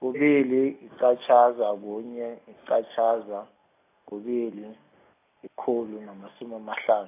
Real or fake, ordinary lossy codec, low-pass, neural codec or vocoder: real; AAC, 24 kbps; 3.6 kHz; none